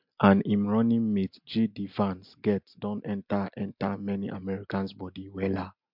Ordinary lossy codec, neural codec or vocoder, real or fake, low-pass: MP3, 48 kbps; none; real; 5.4 kHz